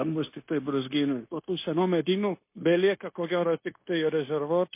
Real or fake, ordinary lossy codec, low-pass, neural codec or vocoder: fake; MP3, 24 kbps; 3.6 kHz; codec, 16 kHz, 0.9 kbps, LongCat-Audio-Codec